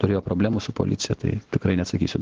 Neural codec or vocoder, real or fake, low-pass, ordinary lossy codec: none; real; 7.2 kHz; Opus, 16 kbps